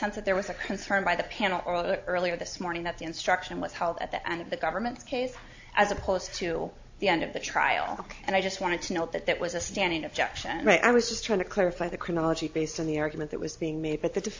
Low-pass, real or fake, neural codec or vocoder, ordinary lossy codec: 7.2 kHz; real; none; AAC, 48 kbps